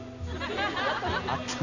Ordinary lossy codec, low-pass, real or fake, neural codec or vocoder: none; 7.2 kHz; real; none